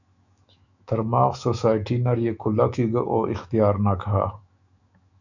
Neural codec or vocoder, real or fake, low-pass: autoencoder, 48 kHz, 128 numbers a frame, DAC-VAE, trained on Japanese speech; fake; 7.2 kHz